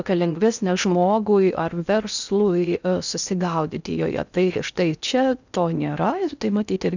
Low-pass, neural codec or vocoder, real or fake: 7.2 kHz; codec, 16 kHz in and 24 kHz out, 0.6 kbps, FocalCodec, streaming, 4096 codes; fake